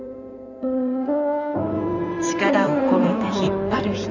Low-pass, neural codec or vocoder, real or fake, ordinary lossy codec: 7.2 kHz; codec, 16 kHz in and 24 kHz out, 2.2 kbps, FireRedTTS-2 codec; fake; none